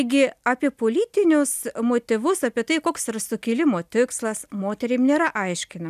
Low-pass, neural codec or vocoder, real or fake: 14.4 kHz; none; real